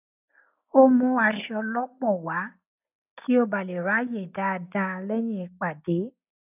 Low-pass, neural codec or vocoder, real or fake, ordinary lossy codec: 3.6 kHz; none; real; none